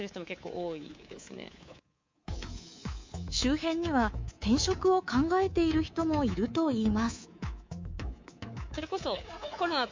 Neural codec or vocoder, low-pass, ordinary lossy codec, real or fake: codec, 24 kHz, 3.1 kbps, DualCodec; 7.2 kHz; MP3, 48 kbps; fake